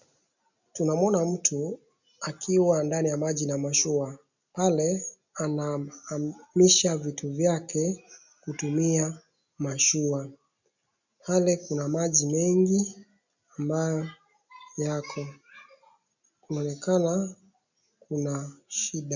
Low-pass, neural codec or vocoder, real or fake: 7.2 kHz; none; real